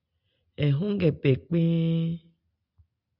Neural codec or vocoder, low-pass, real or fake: none; 5.4 kHz; real